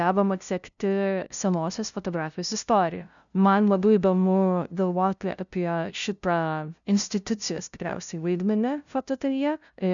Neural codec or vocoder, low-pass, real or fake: codec, 16 kHz, 0.5 kbps, FunCodec, trained on LibriTTS, 25 frames a second; 7.2 kHz; fake